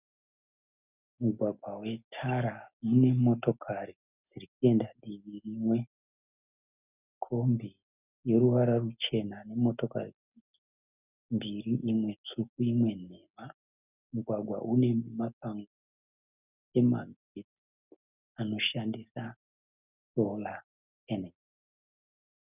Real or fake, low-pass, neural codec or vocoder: real; 3.6 kHz; none